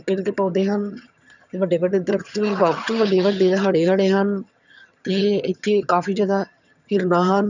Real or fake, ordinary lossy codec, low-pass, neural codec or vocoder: fake; none; 7.2 kHz; vocoder, 22.05 kHz, 80 mel bands, HiFi-GAN